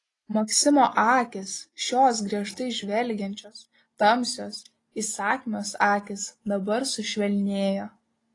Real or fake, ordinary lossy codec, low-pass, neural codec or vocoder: real; AAC, 32 kbps; 10.8 kHz; none